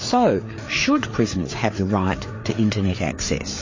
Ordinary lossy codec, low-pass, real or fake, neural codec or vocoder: MP3, 32 kbps; 7.2 kHz; fake; codec, 16 kHz, 4 kbps, FreqCodec, larger model